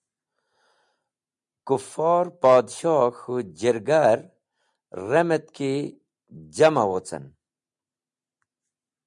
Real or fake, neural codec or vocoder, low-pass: real; none; 10.8 kHz